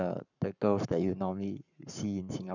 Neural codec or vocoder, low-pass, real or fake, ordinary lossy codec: codec, 44.1 kHz, 7.8 kbps, Pupu-Codec; 7.2 kHz; fake; none